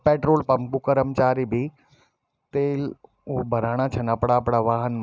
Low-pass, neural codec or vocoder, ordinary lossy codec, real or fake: none; none; none; real